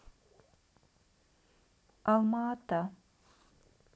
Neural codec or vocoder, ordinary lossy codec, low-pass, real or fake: none; none; none; real